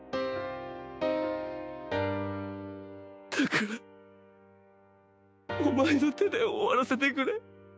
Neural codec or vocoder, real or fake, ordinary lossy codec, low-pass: codec, 16 kHz, 6 kbps, DAC; fake; none; none